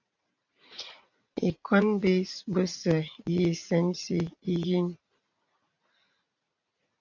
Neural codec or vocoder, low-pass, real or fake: vocoder, 44.1 kHz, 80 mel bands, Vocos; 7.2 kHz; fake